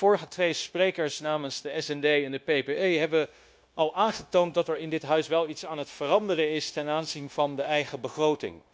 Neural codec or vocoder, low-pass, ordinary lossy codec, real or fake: codec, 16 kHz, 0.9 kbps, LongCat-Audio-Codec; none; none; fake